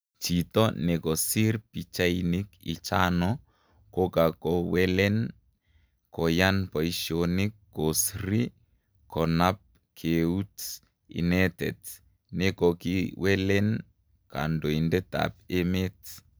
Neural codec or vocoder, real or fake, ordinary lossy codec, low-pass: none; real; none; none